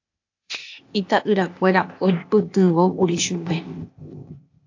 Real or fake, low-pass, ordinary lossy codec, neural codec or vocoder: fake; 7.2 kHz; AAC, 48 kbps; codec, 16 kHz, 0.8 kbps, ZipCodec